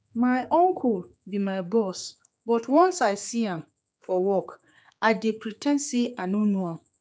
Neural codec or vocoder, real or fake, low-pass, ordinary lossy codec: codec, 16 kHz, 4 kbps, X-Codec, HuBERT features, trained on general audio; fake; none; none